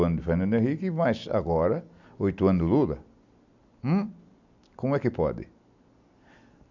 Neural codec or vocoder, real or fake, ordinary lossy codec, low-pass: none; real; none; 7.2 kHz